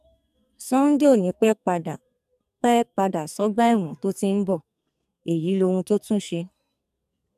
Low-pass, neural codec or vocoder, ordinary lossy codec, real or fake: 14.4 kHz; codec, 44.1 kHz, 2.6 kbps, SNAC; none; fake